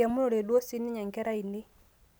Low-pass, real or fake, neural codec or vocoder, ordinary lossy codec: none; real; none; none